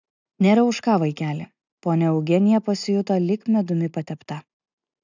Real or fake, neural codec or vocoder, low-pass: real; none; 7.2 kHz